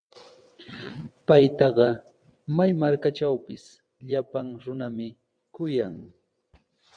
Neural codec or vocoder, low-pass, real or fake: vocoder, 22.05 kHz, 80 mel bands, WaveNeXt; 9.9 kHz; fake